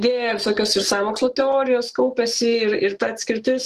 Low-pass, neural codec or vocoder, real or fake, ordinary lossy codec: 14.4 kHz; autoencoder, 48 kHz, 128 numbers a frame, DAC-VAE, trained on Japanese speech; fake; Opus, 16 kbps